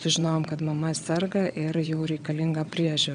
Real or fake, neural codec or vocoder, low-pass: fake; vocoder, 22.05 kHz, 80 mel bands, WaveNeXt; 9.9 kHz